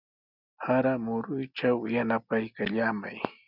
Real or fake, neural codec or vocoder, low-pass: real; none; 5.4 kHz